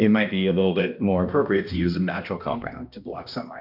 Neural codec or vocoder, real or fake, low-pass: codec, 16 kHz, 1 kbps, X-Codec, HuBERT features, trained on balanced general audio; fake; 5.4 kHz